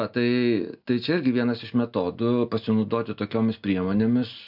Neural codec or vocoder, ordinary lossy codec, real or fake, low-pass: none; MP3, 48 kbps; real; 5.4 kHz